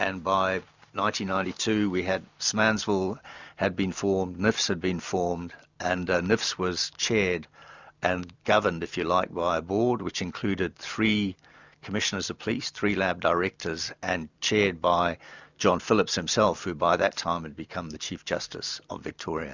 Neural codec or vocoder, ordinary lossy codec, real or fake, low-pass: none; Opus, 64 kbps; real; 7.2 kHz